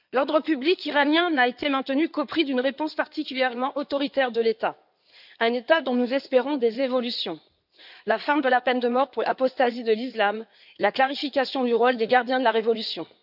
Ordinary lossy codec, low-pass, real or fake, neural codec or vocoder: none; 5.4 kHz; fake; codec, 16 kHz in and 24 kHz out, 2.2 kbps, FireRedTTS-2 codec